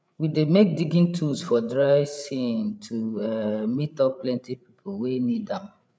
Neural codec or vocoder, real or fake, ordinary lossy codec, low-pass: codec, 16 kHz, 8 kbps, FreqCodec, larger model; fake; none; none